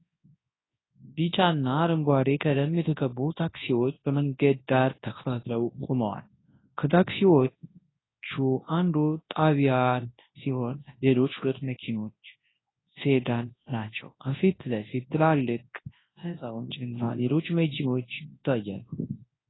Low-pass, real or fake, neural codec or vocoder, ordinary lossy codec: 7.2 kHz; fake; codec, 24 kHz, 0.9 kbps, WavTokenizer, large speech release; AAC, 16 kbps